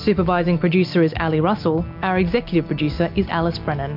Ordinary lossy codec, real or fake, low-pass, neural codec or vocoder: MP3, 48 kbps; real; 5.4 kHz; none